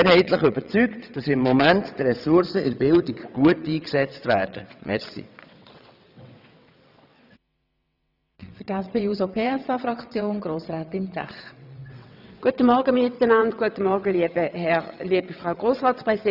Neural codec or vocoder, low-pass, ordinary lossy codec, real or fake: vocoder, 22.05 kHz, 80 mel bands, WaveNeXt; 5.4 kHz; none; fake